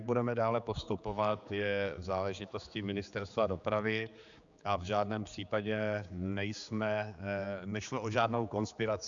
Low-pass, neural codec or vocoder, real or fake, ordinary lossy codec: 7.2 kHz; codec, 16 kHz, 4 kbps, X-Codec, HuBERT features, trained on general audio; fake; Opus, 64 kbps